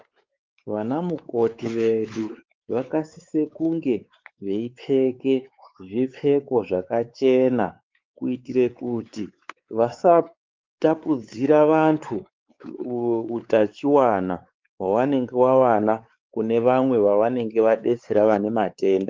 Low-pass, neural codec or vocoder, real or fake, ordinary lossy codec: 7.2 kHz; codec, 16 kHz, 4 kbps, X-Codec, WavLM features, trained on Multilingual LibriSpeech; fake; Opus, 32 kbps